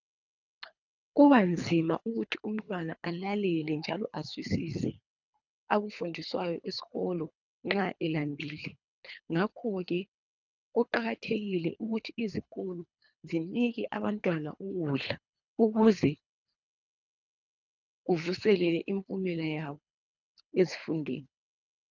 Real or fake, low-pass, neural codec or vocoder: fake; 7.2 kHz; codec, 24 kHz, 3 kbps, HILCodec